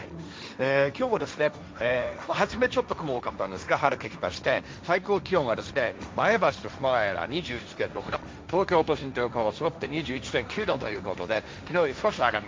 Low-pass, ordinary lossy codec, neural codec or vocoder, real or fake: none; none; codec, 16 kHz, 1.1 kbps, Voila-Tokenizer; fake